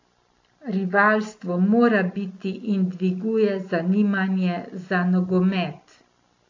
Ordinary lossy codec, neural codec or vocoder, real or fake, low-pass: AAC, 48 kbps; none; real; 7.2 kHz